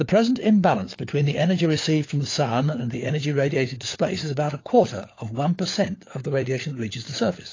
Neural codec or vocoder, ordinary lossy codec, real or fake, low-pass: codec, 16 kHz, 4 kbps, FunCodec, trained on LibriTTS, 50 frames a second; AAC, 32 kbps; fake; 7.2 kHz